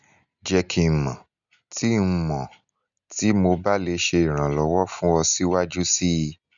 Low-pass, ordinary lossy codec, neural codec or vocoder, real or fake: 7.2 kHz; none; none; real